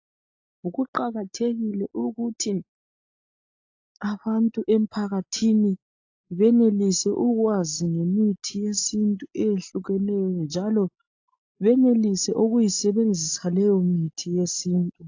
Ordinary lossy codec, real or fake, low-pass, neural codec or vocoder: AAC, 48 kbps; real; 7.2 kHz; none